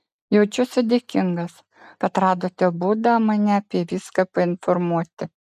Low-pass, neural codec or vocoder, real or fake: 14.4 kHz; none; real